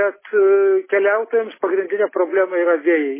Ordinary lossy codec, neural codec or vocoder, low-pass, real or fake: MP3, 16 kbps; none; 3.6 kHz; real